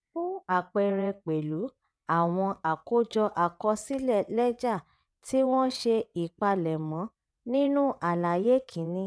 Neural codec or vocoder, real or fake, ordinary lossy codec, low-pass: vocoder, 22.05 kHz, 80 mel bands, WaveNeXt; fake; none; none